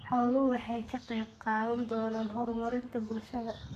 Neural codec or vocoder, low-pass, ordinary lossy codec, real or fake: codec, 32 kHz, 1.9 kbps, SNAC; 14.4 kHz; none; fake